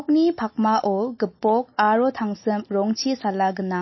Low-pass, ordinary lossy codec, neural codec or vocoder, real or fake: 7.2 kHz; MP3, 24 kbps; none; real